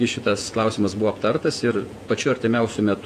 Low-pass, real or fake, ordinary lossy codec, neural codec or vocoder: 14.4 kHz; fake; AAC, 64 kbps; vocoder, 48 kHz, 128 mel bands, Vocos